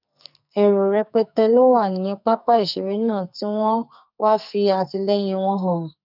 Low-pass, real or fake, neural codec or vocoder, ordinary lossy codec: 5.4 kHz; fake; codec, 44.1 kHz, 2.6 kbps, SNAC; none